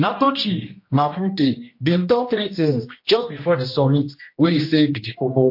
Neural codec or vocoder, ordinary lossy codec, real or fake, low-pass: codec, 16 kHz, 1 kbps, X-Codec, HuBERT features, trained on general audio; MP3, 32 kbps; fake; 5.4 kHz